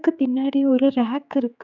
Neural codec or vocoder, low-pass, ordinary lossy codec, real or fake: codec, 16 kHz, 4 kbps, X-Codec, HuBERT features, trained on general audio; 7.2 kHz; none; fake